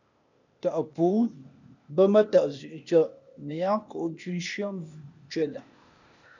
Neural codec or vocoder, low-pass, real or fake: codec, 16 kHz, 0.8 kbps, ZipCodec; 7.2 kHz; fake